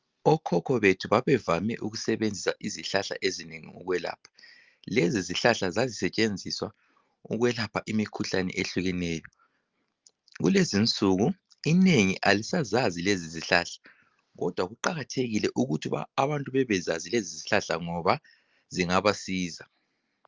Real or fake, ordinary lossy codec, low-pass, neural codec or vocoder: real; Opus, 24 kbps; 7.2 kHz; none